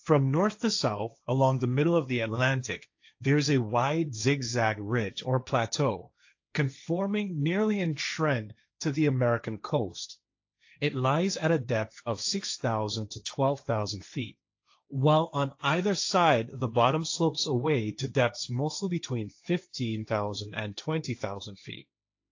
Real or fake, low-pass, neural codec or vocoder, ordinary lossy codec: fake; 7.2 kHz; codec, 16 kHz, 1.1 kbps, Voila-Tokenizer; AAC, 48 kbps